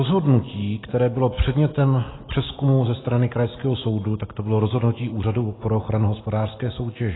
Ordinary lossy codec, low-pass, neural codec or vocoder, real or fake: AAC, 16 kbps; 7.2 kHz; none; real